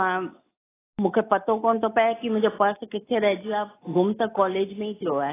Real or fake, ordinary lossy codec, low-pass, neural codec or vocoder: real; AAC, 16 kbps; 3.6 kHz; none